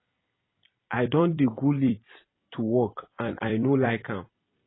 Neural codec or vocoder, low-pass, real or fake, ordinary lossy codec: vocoder, 44.1 kHz, 128 mel bands every 256 samples, BigVGAN v2; 7.2 kHz; fake; AAC, 16 kbps